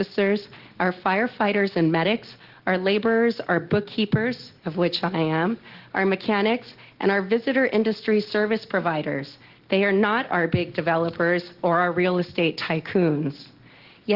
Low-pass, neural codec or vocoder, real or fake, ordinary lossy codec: 5.4 kHz; none; real; Opus, 16 kbps